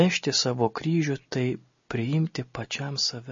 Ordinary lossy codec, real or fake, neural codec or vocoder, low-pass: MP3, 32 kbps; real; none; 7.2 kHz